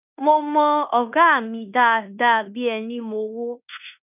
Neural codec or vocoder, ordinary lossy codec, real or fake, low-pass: codec, 16 kHz in and 24 kHz out, 0.9 kbps, LongCat-Audio-Codec, fine tuned four codebook decoder; none; fake; 3.6 kHz